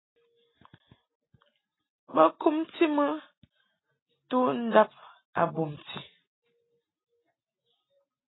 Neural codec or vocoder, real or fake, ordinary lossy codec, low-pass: none; real; AAC, 16 kbps; 7.2 kHz